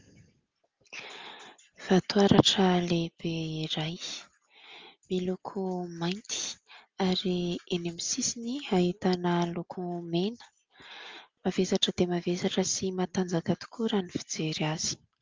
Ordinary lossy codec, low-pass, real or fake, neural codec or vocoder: Opus, 32 kbps; 7.2 kHz; real; none